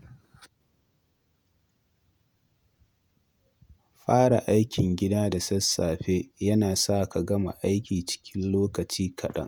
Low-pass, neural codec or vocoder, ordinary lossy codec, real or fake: none; vocoder, 48 kHz, 128 mel bands, Vocos; none; fake